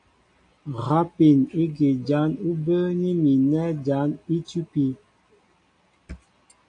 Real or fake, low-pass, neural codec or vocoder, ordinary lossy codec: real; 9.9 kHz; none; AAC, 48 kbps